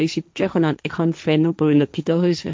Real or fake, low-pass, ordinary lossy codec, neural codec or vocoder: fake; none; none; codec, 16 kHz, 1.1 kbps, Voila-Tokenizer